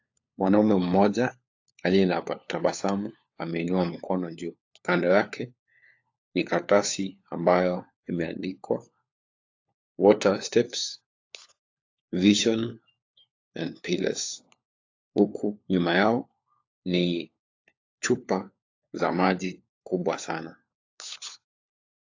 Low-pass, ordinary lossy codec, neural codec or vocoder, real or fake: 7.2 kHz; AAC, 48 kbps; codec, 16 kHz, 4 kbps, FunCodec, trained on LibriTTS, 50 frames a second; fake